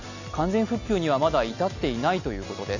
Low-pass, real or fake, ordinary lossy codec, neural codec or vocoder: 7.2 kHz; real; none; none